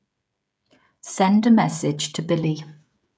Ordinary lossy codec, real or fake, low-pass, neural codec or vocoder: none; fake; none; codec, 16 kHz, 16 kbps, FreqCodec, smaller model